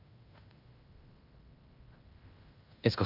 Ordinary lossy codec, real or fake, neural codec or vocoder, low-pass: AAC, 48 kbps; fake; codec, 16 kHz in and 24 kHz out, 0.9 kbps, LongCat-Audio-Codec, fine tuned four codebook decoder; 5.4 kHz